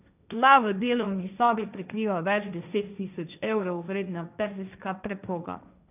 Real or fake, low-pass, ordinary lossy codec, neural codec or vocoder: fake; 3.6 kHz; none; codec, 16 kHz, 1.1 kbps, Voila-Tokenizer